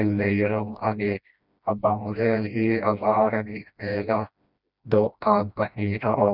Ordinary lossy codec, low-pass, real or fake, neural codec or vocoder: none; 5.4 kHz; fake; codec, 16 kHz, 1 kbps, FreqCodec, smaller model